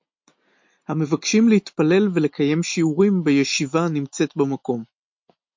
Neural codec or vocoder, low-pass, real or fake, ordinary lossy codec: none; 7.2 kHz; real; MP3, 48 kbps